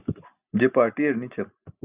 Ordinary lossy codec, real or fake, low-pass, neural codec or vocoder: Opus, 64 kbps; real; 3.6 kHz; none